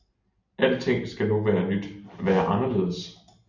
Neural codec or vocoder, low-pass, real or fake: none; 7.2 kHz; real